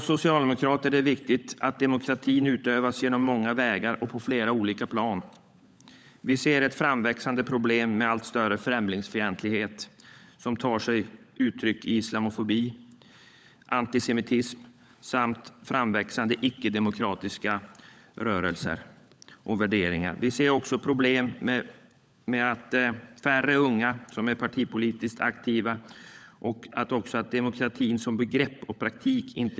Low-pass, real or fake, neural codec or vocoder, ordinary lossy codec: none; fake; codec, 16 kHz, 16 kbps, FunCodec, trained on LibriTTS, 50 frames a second; none